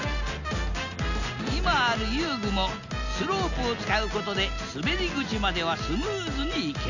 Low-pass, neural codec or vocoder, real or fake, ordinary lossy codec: 7.2 kHz; none; real; none